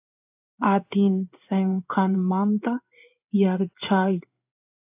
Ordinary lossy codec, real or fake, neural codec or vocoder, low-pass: AAC, 32 kbps; fake; codec, 16 kHz in and 24 kHz out, 1 kbps, XY-Tokenizer; 3.6 kHz